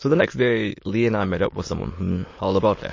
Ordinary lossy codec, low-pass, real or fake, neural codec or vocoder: MP3, 32 kbps; 7.2 kHz; fake; autoencoder, 22.05 kHz, a latent of 192 numbers a frame, VITS, trained on many speakers